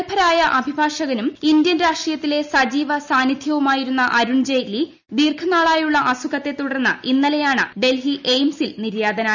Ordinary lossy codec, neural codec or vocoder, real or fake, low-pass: none; none; real; 7.2 kHz